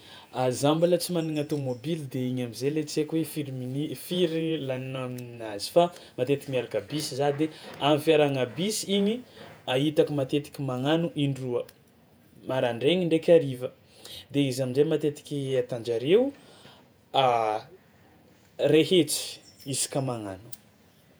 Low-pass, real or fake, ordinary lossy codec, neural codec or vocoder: none; fake; none; vocoder, 48 kHz, 128 mel bands, Vocos